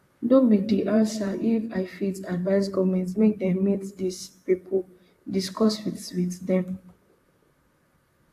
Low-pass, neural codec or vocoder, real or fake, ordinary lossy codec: 14.4 kHz; vocoder, 44.1 kHz, 128 mel bands, Pupu-Vocoder; fake; AAC, 64 kbps